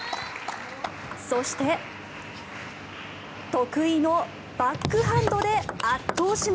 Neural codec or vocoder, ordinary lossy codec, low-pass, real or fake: none; none; none; real